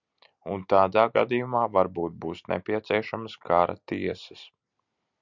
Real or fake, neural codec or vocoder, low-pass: real; none; 7.2 kHz